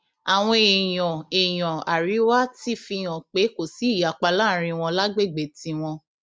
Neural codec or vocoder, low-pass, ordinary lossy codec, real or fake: none; none; none; real